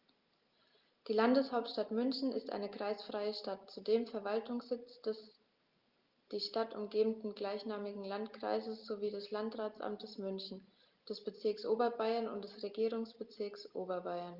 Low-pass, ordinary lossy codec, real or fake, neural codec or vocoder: 5.4 kHz; Opus, 32 kbps; real; none